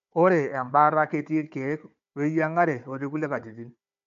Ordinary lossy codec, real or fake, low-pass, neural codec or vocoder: AAC, 64 kbps; fake; 7.2 kHz; codec, 16 kHz, 4 kbps, FunCodec, trained on Chinese and English, 50 frames a second